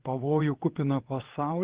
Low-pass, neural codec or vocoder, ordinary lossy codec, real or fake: 3.6 kHz; vocoder, 22.05 kHz, 80 mel bands, WaveNeXt; Opus, 16 kbps; fake